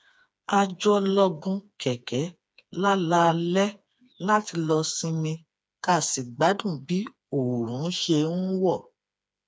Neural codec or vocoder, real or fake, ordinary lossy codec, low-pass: codec, 16 kHz, 4 kbps, FreqCodec, smaller model; fake; none; none